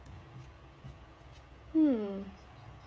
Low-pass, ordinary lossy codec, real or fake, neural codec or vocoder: none; none; fake; codec, 16 kHz, 16 kbps, FreqCodec, smaller model